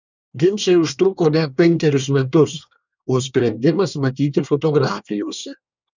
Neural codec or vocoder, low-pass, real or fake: codec, 24 kHz, 1 kbps, SNAC; 7.2 kHz; fake